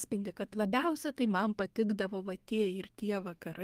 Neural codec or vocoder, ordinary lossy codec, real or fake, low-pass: codec, 32 kHz, 1.9 kbps, SNAC; Opus, 32 kbps; fake; 14.4 kHz